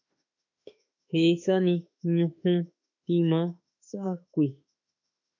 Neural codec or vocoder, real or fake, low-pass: autoencoder, 48 kHz, 32 numbers a frame, DAC-VAE, trained on Japanese speech; fake; 7.2 kHz